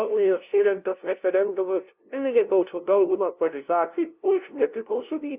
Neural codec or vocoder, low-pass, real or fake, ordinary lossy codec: codec, 16 kHz, 0.5 kbps, FunCodec, trained on LibriTTS, 25 frames a second; 3.6 kHz; fake; Opus, 64 kbps